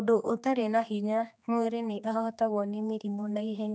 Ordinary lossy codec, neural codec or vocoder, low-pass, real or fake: none; codec, 16 kHz, 2 kbps, X-Codec, HuBERT features, trained on general audio; none; fake